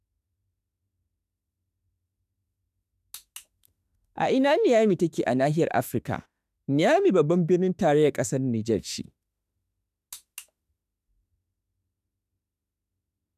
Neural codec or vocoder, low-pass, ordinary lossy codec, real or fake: autoencoder, 48 kHz, 32 numbers a frame, DAC-VAE, trained on Japanese speech; 14.4 kHz; none; fake